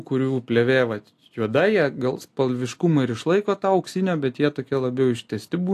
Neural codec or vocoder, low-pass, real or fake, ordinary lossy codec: none; 14.4 kHz; real; AAC, 64 kbps